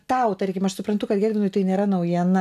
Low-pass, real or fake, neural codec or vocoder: 14.4 kHz; real; none